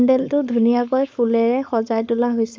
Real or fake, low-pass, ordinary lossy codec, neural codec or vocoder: fake; none; none; codec, 16 kHz, 4.8 kbps, FACodec